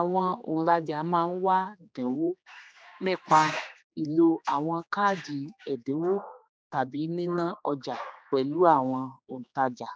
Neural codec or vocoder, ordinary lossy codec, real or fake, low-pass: codec, 16 kHz, 2 kbps, X-Codec, HuBERT features, trained on general audio; none; fake; none